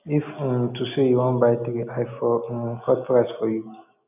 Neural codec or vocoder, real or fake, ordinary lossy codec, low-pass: none; real; none; 3.6 kHz